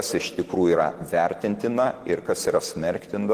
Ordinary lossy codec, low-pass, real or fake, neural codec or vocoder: Opus, 16 kbps; 14.4 kHz; real; none